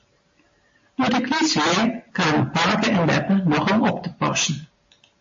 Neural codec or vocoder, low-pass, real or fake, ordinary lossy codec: none; 7.2 kHz; real; MP3, 32 kbps